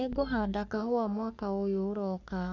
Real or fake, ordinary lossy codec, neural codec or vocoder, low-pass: fake; none; codec, 44.1 kHz, 3.4 kbps, Pupu-Codec; 7.2 kHz